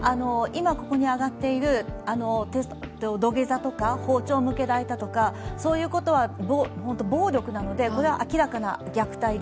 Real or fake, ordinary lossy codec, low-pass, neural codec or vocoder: real; none; none; none